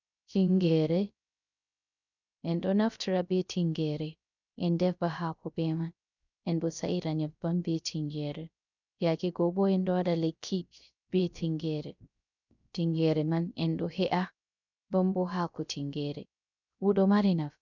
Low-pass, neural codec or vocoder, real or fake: 7.2 kHz; codec, 16 kHz, 0.3 kbps, FocalCodec; fake